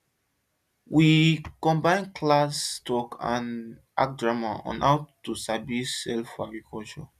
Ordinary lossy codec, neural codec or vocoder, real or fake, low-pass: none; none; real; 14.4 kHz